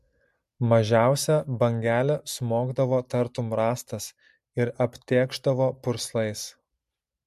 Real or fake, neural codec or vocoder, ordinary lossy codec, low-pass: real; none; MP3, 64 kbps; 14.4 kHz